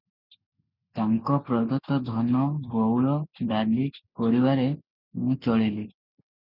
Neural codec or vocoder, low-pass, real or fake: none; 5.4 kHz; real